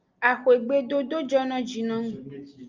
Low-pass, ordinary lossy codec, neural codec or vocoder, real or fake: 7.2 kHz; Opus, 32 kbps; none; real